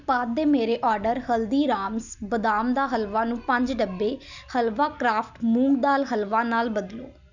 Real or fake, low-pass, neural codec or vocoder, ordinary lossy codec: real; 7.2 kHz; none; none